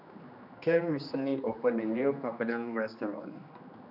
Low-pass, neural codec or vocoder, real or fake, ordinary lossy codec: 5.4 kHz; codec, 16 kHz, 2 kbps, X-Codec, HuBERT features, trained on general audio; fake; none